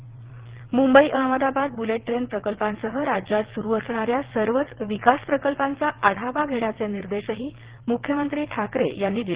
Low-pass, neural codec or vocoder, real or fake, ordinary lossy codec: 3.6 kHz; vocoder, 22.05 kHz, 80 mel bands, WaveNeXt; fake; Opus, 16 kbps